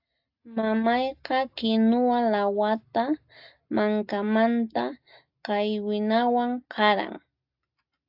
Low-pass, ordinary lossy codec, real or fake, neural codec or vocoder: 5.4 kHz; AAC, 48 kbps; real; none